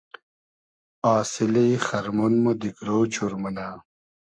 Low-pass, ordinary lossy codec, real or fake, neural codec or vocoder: 9.9 kHz; MP3, 48 kbps; fake; codec, 44.1 kHz, 7.8 kbps, Pupu-Codec